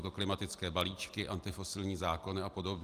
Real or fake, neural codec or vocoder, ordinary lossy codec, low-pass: real; none; Opus, 32 kbps; 14.4 kHz